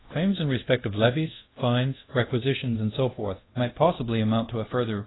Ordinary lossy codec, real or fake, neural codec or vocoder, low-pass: AAC, 16 kbps; fake; codec, 24 kHz, 0.5 kbps, DualCodec; 7.2 kHz